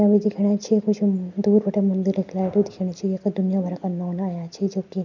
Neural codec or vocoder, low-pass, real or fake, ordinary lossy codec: none; 7.2 kHz; real; none